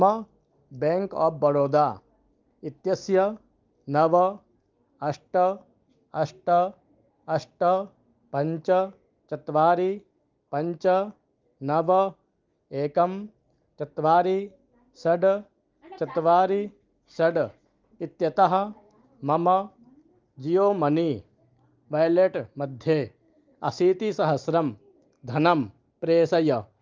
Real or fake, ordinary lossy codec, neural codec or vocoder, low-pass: real; Opus, 32 kbps; none; 7.2 kHz